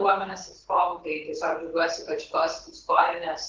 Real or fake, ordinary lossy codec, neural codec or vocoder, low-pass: fake; Opus, 16 kbps; codec, 24 kHz, 6 kbps, HILCodec; 7.2 kHz